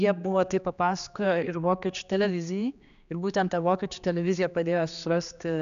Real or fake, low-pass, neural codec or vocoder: fake; 7.2 kHz; codec, 16 kHz, 2 kbps, X-Codec, HuBERT features, trained on general audio